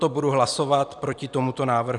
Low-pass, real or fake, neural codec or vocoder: 10.8 kHz; fake; vocoder, 44.1 kHz, 128 mel bands every 256 samples, BigVGAN v2